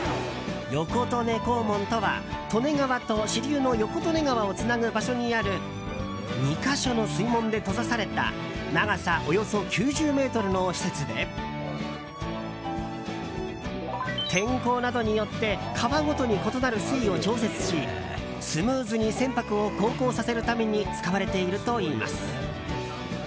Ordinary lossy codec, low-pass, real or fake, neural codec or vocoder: none; none; real; none